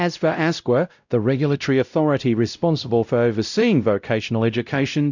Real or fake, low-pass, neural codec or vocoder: fake; 7.2 kHz; codec, 16 kHz, 0.5 kbps, X-Codec, WavLM features, trained on Multilingual LibriSpeech